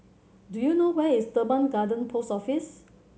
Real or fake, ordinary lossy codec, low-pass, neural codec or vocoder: real; none; none; none